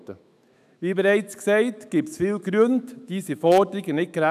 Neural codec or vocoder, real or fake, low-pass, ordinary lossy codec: autoencoder, 48 kHz, 128 numbers a frame, DAC-VAE, trained on Japanese speech; fake; 14.4 kHz; none